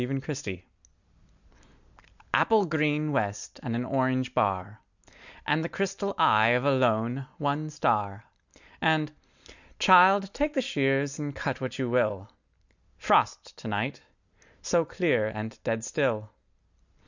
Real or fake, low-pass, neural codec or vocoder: real; 7.2 kHz; none